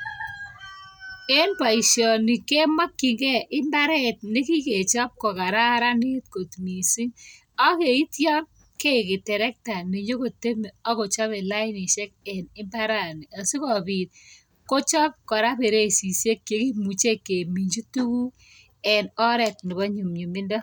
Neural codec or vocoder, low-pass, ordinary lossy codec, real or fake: none; none; none; real